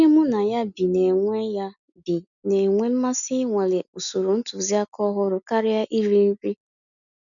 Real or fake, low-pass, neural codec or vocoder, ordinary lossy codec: real; 7.2 kHz; none; none